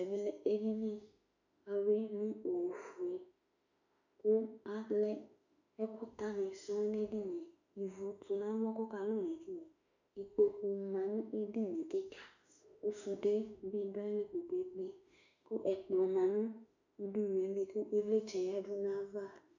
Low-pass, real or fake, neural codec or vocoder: 7.2 kHz; fake; autoencoder, 48 kHz, 32 numbers a frame, DAC-VAE, trained on Japanese speech